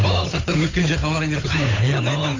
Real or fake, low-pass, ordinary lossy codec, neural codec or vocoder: fake; 7.2 kHz; AAC, 32 kbps; codec, 16 kHz, 8 kbps, FunCodec, trained on Chinese and English, 25 frames a second